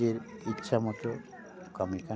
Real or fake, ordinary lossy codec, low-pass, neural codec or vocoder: real; none; none; none